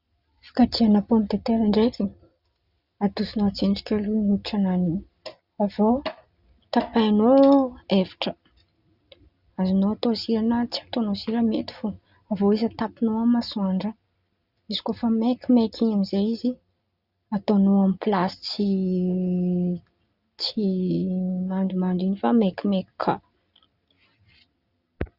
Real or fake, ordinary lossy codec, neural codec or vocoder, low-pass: real; Opus, 64 kbps; none; 5.4 kHz